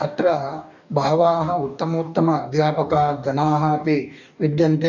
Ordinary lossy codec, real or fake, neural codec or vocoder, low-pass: none; fake; codec, 44.1 kHz, 2.6 kbps, DAC; 7.2 kHz